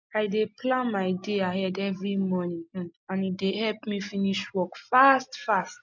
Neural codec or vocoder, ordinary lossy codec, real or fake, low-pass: none; MP3, 48 kbps; real; 7.2 kHz